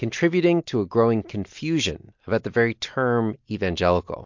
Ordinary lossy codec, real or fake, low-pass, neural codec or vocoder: MP3, 48 kbps; real; 7.2 kHz; none